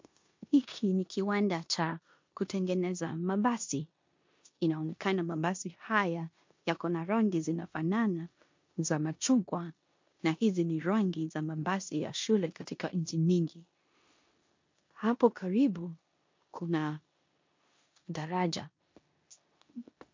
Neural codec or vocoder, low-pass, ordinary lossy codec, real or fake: codec, 16 kHz in and 24 kHz out, 0.9 kbps, LongCat-Audio-Codec, four codebook decoder; 7.2 kHz; MP3, 48 kbps; fake